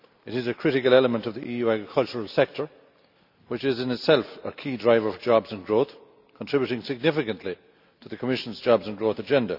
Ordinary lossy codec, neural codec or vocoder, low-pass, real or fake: none; none; 5.4 kHz; real